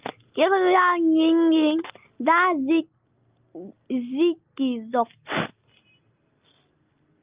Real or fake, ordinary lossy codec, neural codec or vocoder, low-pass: real; Opus, 24 kbps; none; 3.6 kHz